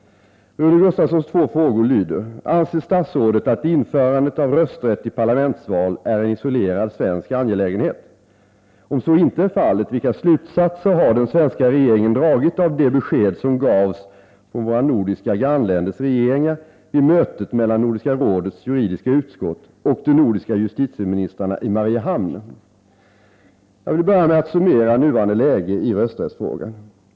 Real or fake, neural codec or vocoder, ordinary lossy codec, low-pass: real; none; none; none